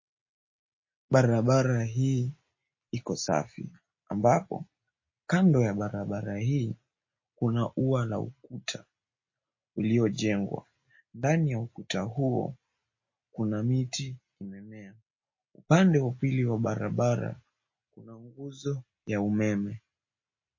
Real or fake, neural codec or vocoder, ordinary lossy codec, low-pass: real; none; MP3, 32 kbps; 7.2 kHz